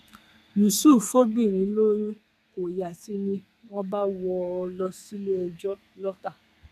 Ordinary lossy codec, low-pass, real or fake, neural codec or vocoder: none; 14.4 kHz; fake; codec, 32 kHz, 1.9 kbps, SNAC